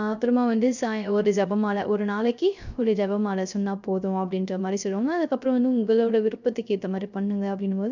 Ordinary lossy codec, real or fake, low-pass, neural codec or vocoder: none; fake; 7.2 kHz; codec, 16 kHz, 0.3 kbps, FocalCodec